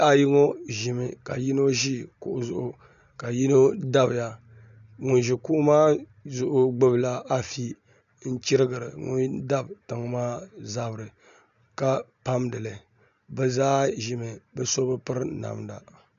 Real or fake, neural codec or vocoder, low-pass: real; none; 7.2 kHz